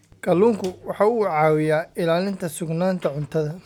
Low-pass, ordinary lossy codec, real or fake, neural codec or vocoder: 19.8 kHz; none; real; none